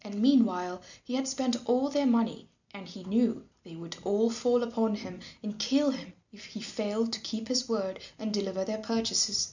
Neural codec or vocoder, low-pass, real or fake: none; 7.2 kHz; real